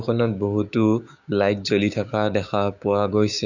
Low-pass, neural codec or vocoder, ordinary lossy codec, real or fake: 7.2 kHz; codec, 16 kHz, 6 kbps, DAC; none; fake